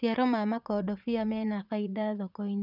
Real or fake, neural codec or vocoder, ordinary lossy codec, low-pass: fake; vocoder, 44.1 kHz, 80 mel bands, Vocos; none; 5.4 kHz